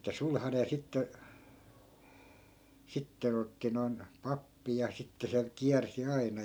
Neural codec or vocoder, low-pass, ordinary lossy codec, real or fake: none; none; none; real